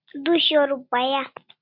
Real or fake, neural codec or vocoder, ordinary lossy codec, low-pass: real; none; MP3, 48 kbps; 5.4 kHz